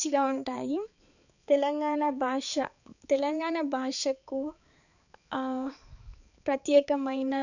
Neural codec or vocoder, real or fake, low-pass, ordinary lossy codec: codec, 16 kHz, 4 kbps, X-Codec, HuBERT features, trained on balanced general audio; fake; 7.2 kHz; none